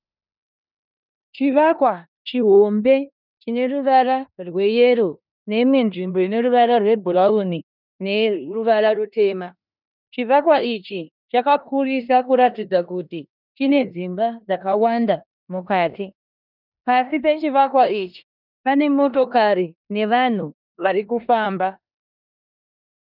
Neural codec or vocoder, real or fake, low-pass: codec, 16 kHz in and 24 kHz out, 0.9 kbps, LongCat-Audio-Codec, four codebook decoder; fake; 5.4 kHz